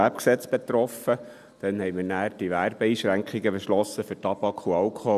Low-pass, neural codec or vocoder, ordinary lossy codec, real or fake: 14.4 kHz; none; none; real